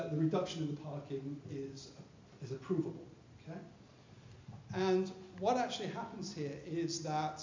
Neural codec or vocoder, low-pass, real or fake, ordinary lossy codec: none; 7.2 kHz; real; MP3, 64 kbps